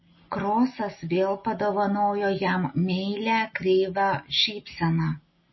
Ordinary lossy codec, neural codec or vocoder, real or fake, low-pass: MP3, 24 kbps; none; real; 7.2 kHz